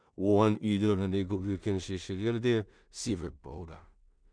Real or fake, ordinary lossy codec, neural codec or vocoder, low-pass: fake; none; codec, 16 kHz in and 24 kHz out, 0.4 kbps, LongCat-Audio-Codec, two codebook decoder; 9.9 kHz